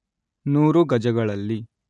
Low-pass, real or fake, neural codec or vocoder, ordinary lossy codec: 10.8 kHz; real; none; none